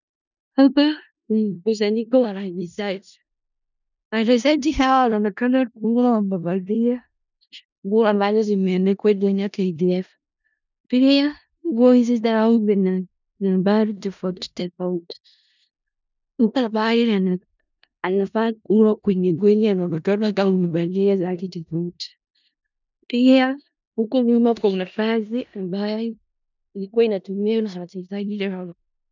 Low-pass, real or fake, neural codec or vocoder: 7.2 kHz; fake; codec, 16 kHz in and 24 kHz out, 0.4 kbps, LongCat-Audio-Codec, four codebook decoder